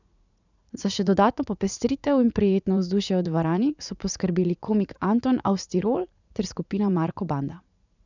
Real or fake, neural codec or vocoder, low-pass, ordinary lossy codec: fake; vocoder, 44.1 kHz, 80 mel bands, Vocos; 7.2 kHz; none